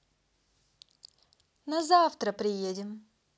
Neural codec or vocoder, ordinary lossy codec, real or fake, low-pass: none; none; real; none